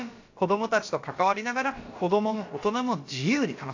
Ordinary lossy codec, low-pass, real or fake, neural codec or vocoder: AAC, 48 kbps; 7.2 kHz; fake; codec, 16 kHz, about 1 kbps, DyCAST, with the encoder's durations